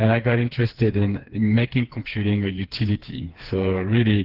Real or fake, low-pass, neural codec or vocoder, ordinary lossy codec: fake; 5.4 kHz; codec, 16 kHz, 4 kbps, FreqCodec, smaller model; Opus, 16 kbps